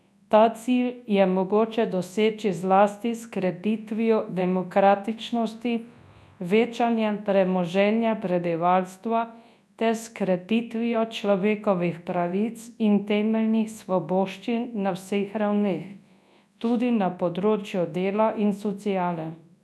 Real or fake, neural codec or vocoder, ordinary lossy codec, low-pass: fake; codec, 24 kHz, 0.9 kbps, WavTokenizer, large speech release; none; none